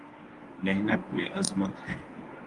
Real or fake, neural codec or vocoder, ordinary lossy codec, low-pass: fake; codec, 24 kHz, 0.9 kbps, WavTokenizer, medium speech release version 1; Opus, 32 kbps; 10.8 kHz